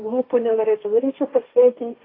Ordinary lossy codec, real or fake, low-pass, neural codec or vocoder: AAC, 24 kbps; fake; 5.4 kHz; codec, 16 kHz, 1.1 kbps, Voila-Tokenizer